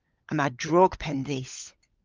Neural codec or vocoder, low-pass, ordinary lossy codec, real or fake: codec, 16 kHz, 16 kbps, FunCodec, trained on LibriTTS, 50 frames a second; 7.2 kHz; Opus, 32 kbps; fake